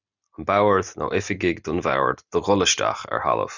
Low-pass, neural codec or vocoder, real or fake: 7.2 kHz; none; real